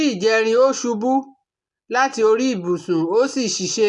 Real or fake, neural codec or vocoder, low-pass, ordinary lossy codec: real; none; 10.8 kHz; none